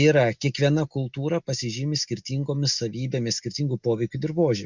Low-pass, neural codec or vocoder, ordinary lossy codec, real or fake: 7.2 kHz; none; Opus, 64 kbps; real